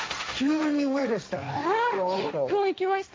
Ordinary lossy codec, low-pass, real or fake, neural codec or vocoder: none; none; fake; codec, 16 kHz, 1.1 kbps, Voila-Tokenizer